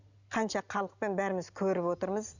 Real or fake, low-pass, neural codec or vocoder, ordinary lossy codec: real; 7.2 kHz; none; none